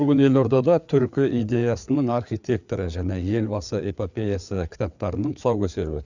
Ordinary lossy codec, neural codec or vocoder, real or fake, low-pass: none; codec, 16 kHz, 4 kbps, FreqCodec, larger model; fake; 7.2 kHz